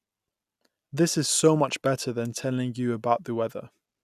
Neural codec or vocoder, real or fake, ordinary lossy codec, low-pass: none; real; none; 14.4 kHz